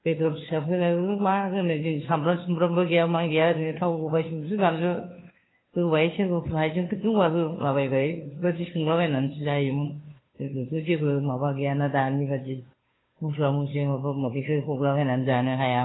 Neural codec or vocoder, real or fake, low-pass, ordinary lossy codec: codec, 16 kHz, 2 kbps, FunCodec, trained on Chinese and English, 25 frames a second; fake; 7.2 kHz; AAC, 16 kbps